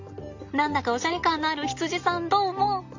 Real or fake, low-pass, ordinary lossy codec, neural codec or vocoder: real; 7.2 kHz; MP3, 64 kbps; none